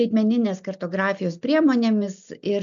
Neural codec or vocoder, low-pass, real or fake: none; 7.2 kHz; real